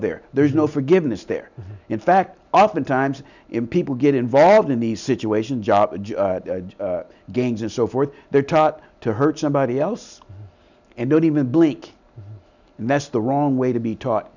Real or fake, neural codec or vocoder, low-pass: real; none; 7.2 kHz